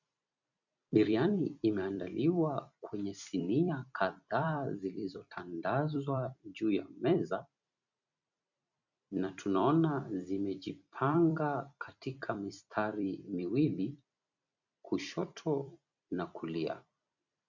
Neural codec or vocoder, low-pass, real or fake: vocoder, 44.1 kHz, 128 mel bands every 256 samples, BigVGAN v2; 7.2 kHz; fake